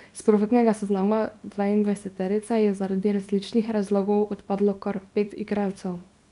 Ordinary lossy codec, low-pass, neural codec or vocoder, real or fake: none; 10.8 kHz; codec, 24 kHz, 0.9 kbps, WavTokenizer, small release; fake